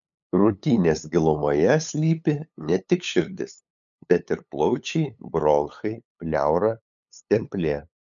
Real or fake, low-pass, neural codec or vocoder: fake; 7.2 kHz; codec, 16 kHz, 8 kbps, FunCodec, trained on LibriTTS, 25 frames a second